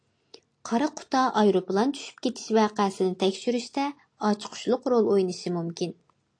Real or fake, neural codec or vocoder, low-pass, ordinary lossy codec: real; none; 9.9 kHz; AAC, 48 kbps